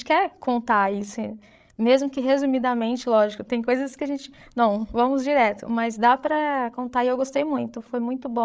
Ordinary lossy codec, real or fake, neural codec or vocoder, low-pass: none; fake; codec, 16 kHz, 8 kbps, FreqCodec, larger model; none